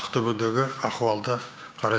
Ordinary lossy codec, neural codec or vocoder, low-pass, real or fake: none; codec, 16 kHz, 6 kbps, DAC; none; fake